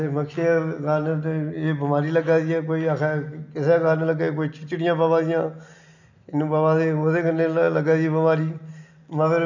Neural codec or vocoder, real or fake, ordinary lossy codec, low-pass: none; real; none; 7.2 kHz